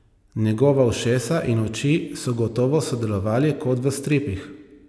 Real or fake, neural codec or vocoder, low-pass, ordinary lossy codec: real; none; none; none